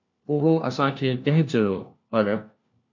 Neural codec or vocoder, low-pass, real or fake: codec, 16 kHz, 1 kbps, FunCodec, trained on LibriTTS, 50 frames a second; 7.2 kHz; fake